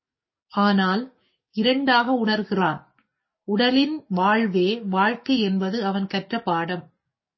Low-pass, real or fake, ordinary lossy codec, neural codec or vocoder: 7.2 kHz; fake; MP3, 24 kbps; codec, 44.1 kHz, 7.8 kbps, DAC